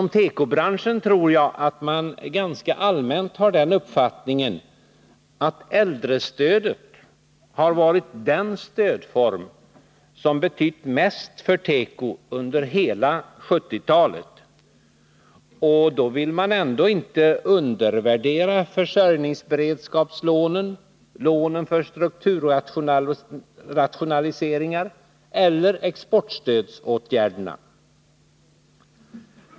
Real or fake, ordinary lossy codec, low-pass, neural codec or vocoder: real; none; none; none